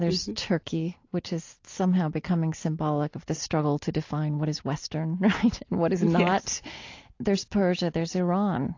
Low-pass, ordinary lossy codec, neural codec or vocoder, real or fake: 7.2 kHz; AAC, 48 kbps; none; real